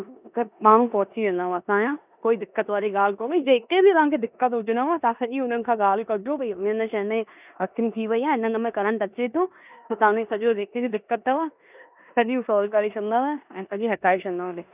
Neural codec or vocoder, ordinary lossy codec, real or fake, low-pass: codec, 16 kHz in and 24 kHz out, 0.9 kbps, LongCat-Audio-Codec, four codebook decoder; none; fake; 3.6 kHz